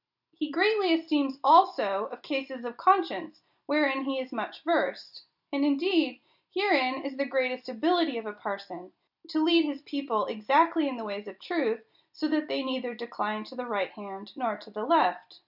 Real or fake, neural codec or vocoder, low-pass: real; none; 5.4 kHz